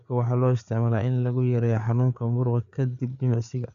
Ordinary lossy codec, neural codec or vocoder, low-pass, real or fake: none; codec, 16 kHz, 4 kbps, FreqCodec, larger model; 7.2 kHz; fake